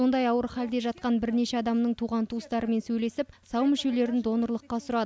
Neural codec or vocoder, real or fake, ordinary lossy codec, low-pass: none; real; none; none